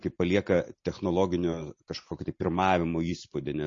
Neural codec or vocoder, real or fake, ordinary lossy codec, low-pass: none; real; MP3, 32 kbps; 7.2 kHz